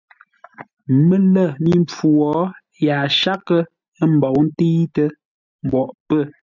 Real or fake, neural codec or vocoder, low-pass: real; none; 7.2 kHz